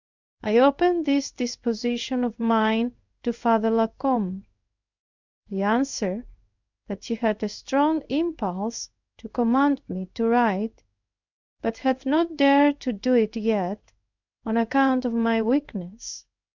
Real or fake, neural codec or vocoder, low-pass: fake; codec, 16 kHz in and 24 kHz out, 1 kbps, XY-Tokenizer; 7.2 kHz